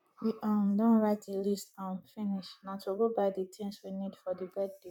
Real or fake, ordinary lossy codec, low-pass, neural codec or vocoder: fake; none; 19.8 kHz; vocoder, 44.1 kHz, 128 mel bands, Pupu-Vocoder